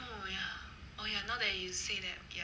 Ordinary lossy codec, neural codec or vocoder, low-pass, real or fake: none; none; none; real